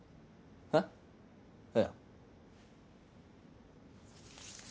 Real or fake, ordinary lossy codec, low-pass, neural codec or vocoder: real; none; none; none